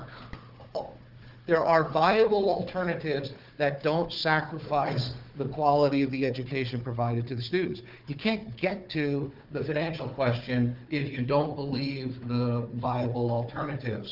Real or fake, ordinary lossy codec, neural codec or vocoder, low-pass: fake; Opus, 24 kbps; codec, 16 kHz, 4 kbps, FunCodec, trained on Chinese and English, 50 frames a second; 5.4 kHz